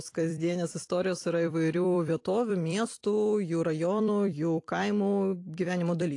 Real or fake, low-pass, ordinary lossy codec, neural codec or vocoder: fake; 10.8 kHz; AAC, 48 kbps; vocoder, 44.1 kHz, 128 mel bands every 256 samples, BigVGAN v2